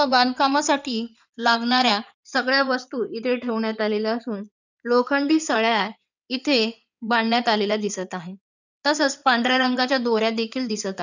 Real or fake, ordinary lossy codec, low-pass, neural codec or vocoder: fake; none; 7.2 kHz; codec, 16 kHz in and 24 kHz out, 2.2 kbps, FireRedTTS-2 codec